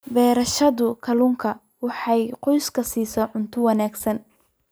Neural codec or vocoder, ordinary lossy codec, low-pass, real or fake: none; none; none; real